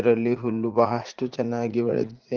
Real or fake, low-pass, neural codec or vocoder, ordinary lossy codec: fake; 7.2 kHz; vocoder, 44.1 kHz, 80 mel bands, Vocos; Opus, 16 kbps